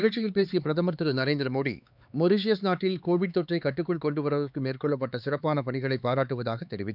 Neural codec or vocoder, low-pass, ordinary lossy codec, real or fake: codec, 16 kHz, 4 kbps, X-Codec, HuBERT features, trained on LibriSpeech; 5.4 kHz; none; fake